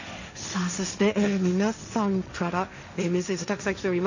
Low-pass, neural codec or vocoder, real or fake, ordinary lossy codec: 7.2 kHz; codec, 16 kHz, 1.1 kbps, Voila-Tokenizer; fake; none